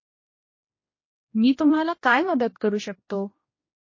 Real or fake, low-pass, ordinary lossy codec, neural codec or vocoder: fake; 7.2 kHz; MP3, 32 kbps; codec, 16 kHz, 1 kbps, X-Codec, HuBERT features, trained on general audio